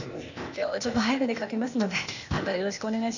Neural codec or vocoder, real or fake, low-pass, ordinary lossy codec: codec, 16 kHz, 0.8 kbps, ZipCodec; fake; 7.2 kHz; none